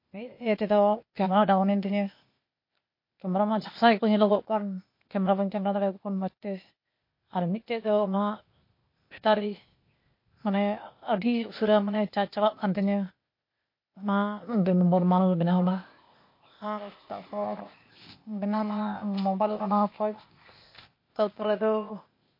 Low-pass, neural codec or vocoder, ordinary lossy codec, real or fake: 5.4 kHz; codec, 16 kHz, 0.8 kbps, ZipCodec; MP3, 32 kbps; fake